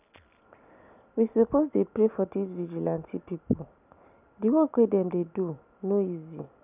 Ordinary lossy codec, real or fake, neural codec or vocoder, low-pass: none; real; none; 3.6 kHz